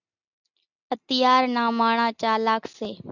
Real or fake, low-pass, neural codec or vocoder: real; 7.2 kHz; none